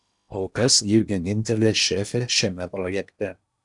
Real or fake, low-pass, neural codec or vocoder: fake; 10.8 kHz; codec, 16 kHz in and 24 kHz out, 0.8 kbps, FocalCodec, streaming, 65536 codes